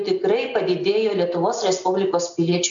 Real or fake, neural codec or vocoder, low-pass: real; none; 7.2 kHz